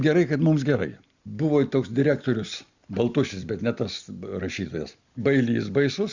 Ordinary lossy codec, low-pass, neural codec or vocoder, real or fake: Opus, 64 kbps; 7.2 kHz; none; real